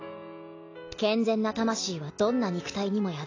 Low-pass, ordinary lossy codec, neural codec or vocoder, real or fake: 7.2 kHz; AAC, 32 kbps; none; real